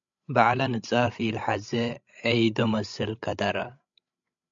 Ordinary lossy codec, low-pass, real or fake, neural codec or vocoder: MP3, 64 kbps; 7.2 kHz; fake; codec, 16 kHz, 16 kbps, FreqCodec, larger model